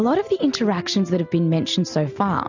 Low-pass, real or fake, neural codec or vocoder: 7.2 kHz; real; none